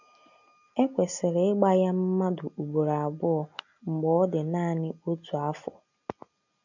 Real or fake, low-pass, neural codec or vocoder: real; 7.2 kHz; none